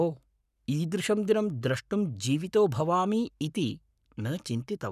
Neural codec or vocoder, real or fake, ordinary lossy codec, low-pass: codec, 44.1 kHz, 7.8 kbps, Pupu-Codec; fake; none; 14.4 kHz